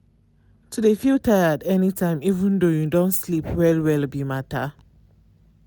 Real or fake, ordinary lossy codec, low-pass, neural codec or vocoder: real; none; none; none